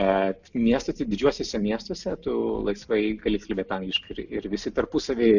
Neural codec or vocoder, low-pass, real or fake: none; 7.2 kHz; real